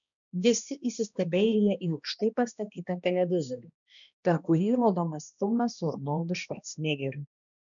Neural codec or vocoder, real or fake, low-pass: codec, 16 kHz, 1 kbps, X-Codec, HuBERT features, trained on balanced general audio; fake; 7.2 kHz